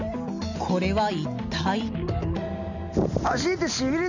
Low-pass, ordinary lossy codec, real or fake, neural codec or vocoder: 7.2 kHz; none; real; none